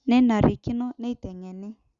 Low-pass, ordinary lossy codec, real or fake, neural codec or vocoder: 7.2 kHz; Opus, 64 kbps; real; none